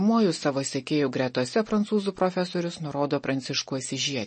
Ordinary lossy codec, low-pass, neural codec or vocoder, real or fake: MP3, 32 kbps; 10.8 kHz; none; real